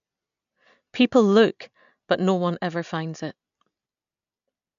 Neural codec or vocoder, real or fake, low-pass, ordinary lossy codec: none; real; 7.2 kHz; AAC, 96 kbps